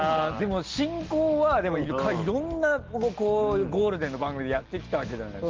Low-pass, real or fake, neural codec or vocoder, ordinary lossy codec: 7.2 kHz; real; none; Opus, 16 kbps